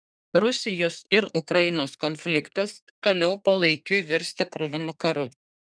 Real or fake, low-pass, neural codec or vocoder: fake; 9.9 kHz; codec, 24 kHz, 1 kbps, SNAC